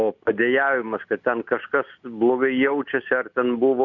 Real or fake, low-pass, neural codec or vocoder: real; 7.2 kHz; none